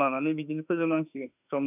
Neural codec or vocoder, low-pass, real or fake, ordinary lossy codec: autoencoder, 48 kHz, 32 numbers a frame, DAC-VAE, trained on Japanese speech; 3.6 kHz; fake; none